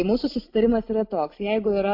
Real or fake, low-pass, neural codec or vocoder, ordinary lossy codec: real; 5.4 kHz; none; MP3, 48 kbps